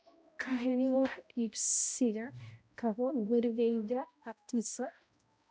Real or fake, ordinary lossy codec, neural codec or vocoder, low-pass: fake; none; codec, 16 kHz, 0.5 kbps, X-Codec, HuBERT features, trained on balanced general audio; none